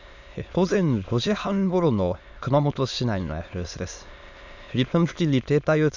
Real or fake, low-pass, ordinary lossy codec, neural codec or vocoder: fake; 7.2 kHz; none; autoencoder, 22.05 kHz, a latent of 192 numbers a frame, VITS, trained on many speakers